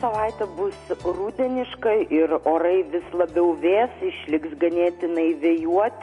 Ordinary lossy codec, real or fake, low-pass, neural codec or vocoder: MP3, 48 kbps; real; 14.4 kHz; none